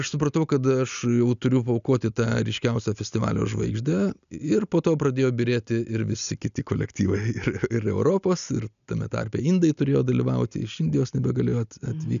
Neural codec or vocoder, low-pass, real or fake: none; 7.2 kHz; real